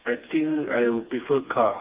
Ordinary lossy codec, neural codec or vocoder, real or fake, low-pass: Opus, 24 kbps; codec, 16 kHz, 2 kbps, FreqCodec, smaller model; fake; 3.6 kHz